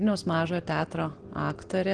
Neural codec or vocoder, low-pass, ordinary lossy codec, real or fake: none; 9.9 kHz; Opus, 16 kbps; real